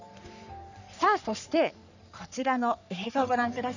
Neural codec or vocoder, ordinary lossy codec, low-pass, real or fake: codec, 44.1 kHz, 3.4 kbps, Pupu-Codec; none; 7.2 kHz; fake